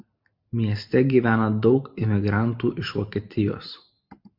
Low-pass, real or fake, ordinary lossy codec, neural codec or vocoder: 5.4 kHz; real; MP3, 48 kbps; none